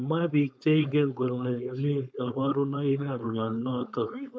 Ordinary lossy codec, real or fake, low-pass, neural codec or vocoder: none; fake; none; codec, 16 kHz, 4.8 kbps, FACodec